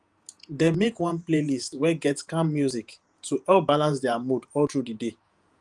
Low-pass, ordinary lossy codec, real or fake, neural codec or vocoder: 10.8 kHz; Opus, 32 kbps; fake; vocoder, 48 kHz, 128 mel bands, Vocos